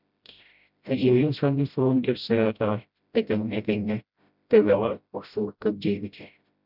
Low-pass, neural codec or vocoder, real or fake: 5.4 kHz; codec, 16 kHz, 0.5 kbps, FreqCodec, smaller model; fake